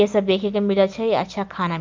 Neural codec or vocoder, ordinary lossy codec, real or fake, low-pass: none; Opus, 32 kbps; real; 7.2 kHz